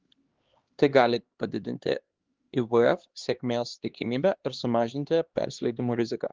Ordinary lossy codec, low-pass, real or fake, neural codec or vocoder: Opus, 16 kbps; 7.2 kHz; fake; codec, 16 kHz, 2 kbps, X-Codec, HuBERT features, trained on LibriSpeech